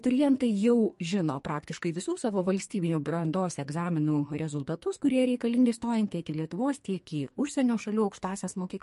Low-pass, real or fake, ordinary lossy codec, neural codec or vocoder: 14.4 kHz; fake; MP3, 48 kbps; codec, 44.1 kHz, 2.6 kbps, SNAC